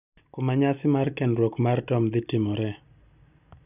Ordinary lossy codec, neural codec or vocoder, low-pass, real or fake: none; none; 3.6 kHz; real